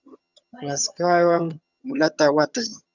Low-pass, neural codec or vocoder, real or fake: 7.2 kHz; vocoder, 22.05 kHz, 80 mel bands, HiFi-GAN; fake